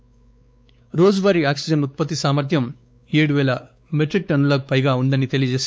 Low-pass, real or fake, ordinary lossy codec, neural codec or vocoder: none; fake; none; codec, 16 kHz, 4 kbps, X-Codec, WavLM features, trained on Multilingual LibriSpeech